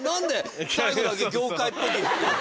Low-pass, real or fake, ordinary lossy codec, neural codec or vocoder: none; real; none; none